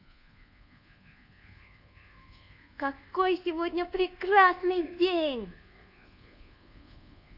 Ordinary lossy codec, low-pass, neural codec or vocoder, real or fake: none; 5.4 kHz; codec, 24 kHz, 1.2 kbps, DualCodec; fake